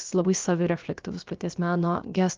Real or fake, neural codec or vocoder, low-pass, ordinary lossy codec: fake; codec, 16 kHz, about 1 kbps, DyCAST, with the encoder's durations; 7.2 kHz; Opus, 32 kbps